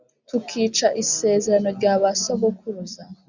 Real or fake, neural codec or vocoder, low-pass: real; none; 7.2 kHz